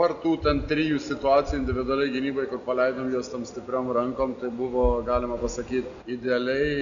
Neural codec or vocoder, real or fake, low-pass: none; real; 7.2 kHz